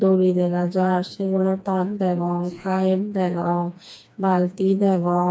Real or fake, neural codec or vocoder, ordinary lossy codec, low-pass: fake; codec, 16 kHz, 2 kbps, FreqCodec, smaller model; none; none